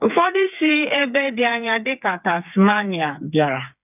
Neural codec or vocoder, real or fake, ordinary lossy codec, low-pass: codec, 16 kHz, 4 kbps, FreqCodec, smaller model; fake; none; 3.6 kHz